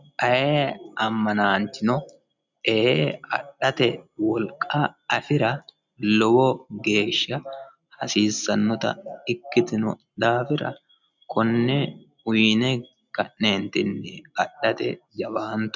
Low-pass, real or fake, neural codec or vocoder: 7.2 kHz; real; none